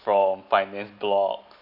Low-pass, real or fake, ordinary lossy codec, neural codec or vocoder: 5.4 kHz; real; none; none